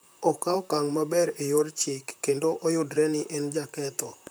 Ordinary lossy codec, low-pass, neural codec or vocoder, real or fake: none; none; vocoder, 44.1 kHz, 128 mel bands, Pupu-Vocoder; fake